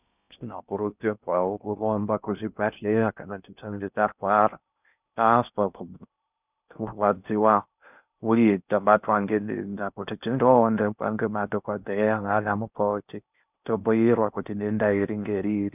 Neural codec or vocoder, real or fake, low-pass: codec, 16 kHz in and 24 kHz out, 0.6 kbps, FocalCodec, streaming, 4096 codes; fake; 3.6 kHz